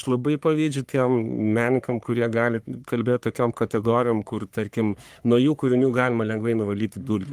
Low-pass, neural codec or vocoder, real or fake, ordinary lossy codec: 14.4 kHz; codec, 44.1 kHz, 3.4 kbps, Pupu-Codec; fake; Opus, 32 kbps